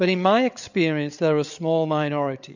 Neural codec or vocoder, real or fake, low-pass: codec, 16 kHz, 8 kbps, FreqCodec, larger model; fake; 7.2 kHz